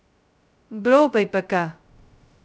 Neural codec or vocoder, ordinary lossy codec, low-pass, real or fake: codec, 16 kHz, 0.2 kbps, FocalCodec; none; none; fake